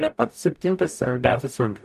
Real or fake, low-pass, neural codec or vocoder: fake; 14.4 kHz; codec, 44.1 kHz, 0.9 kbps, DAC